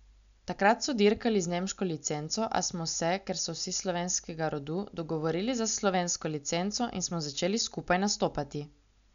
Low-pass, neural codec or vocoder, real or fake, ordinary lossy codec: 7.2 kHz; none; real; none